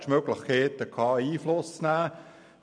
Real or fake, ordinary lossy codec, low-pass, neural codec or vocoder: real; none; 9.9 kHz; none